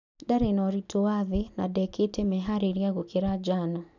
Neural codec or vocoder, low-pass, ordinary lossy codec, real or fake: none; 7.2 kHz; none; real